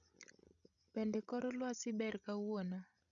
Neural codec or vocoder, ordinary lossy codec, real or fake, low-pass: codec, 16 kHz, 16 kbps, FreqCodec, larger model; none; fake; 7.2 kHz